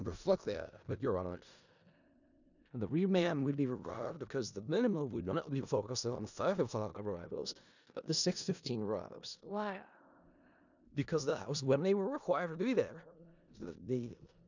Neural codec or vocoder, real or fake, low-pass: codec, 16 kHz in and 24 kHz out, 0.4 kbps, LongCat-Audio-Codec, four codebook decoder; fake; 7.2 kHz